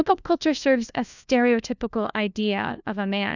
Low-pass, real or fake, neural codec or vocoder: 7.2 kHz; fake; codec, 16 kHz, 1 kbps, FunCodec, trained on LibriTTS, 50 frames a second